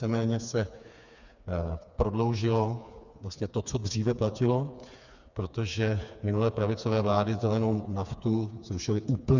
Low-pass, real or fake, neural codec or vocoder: 7.2 kHz; fake; codec, 16 kHz, 4 kbps, FreqCodec, smaller model